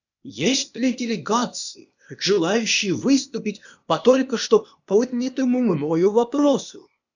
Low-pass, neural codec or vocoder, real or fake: 7.2 kHz; codec, 16 kHz, 0.8 kbps, ZipCodec; fake